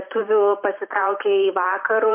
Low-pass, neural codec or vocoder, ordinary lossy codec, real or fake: 3.6 kHz; vocoder, 44.1 kHz, 128 mel bands every 512 samples, BigVGAN v2; MP3, 24 kbps; fake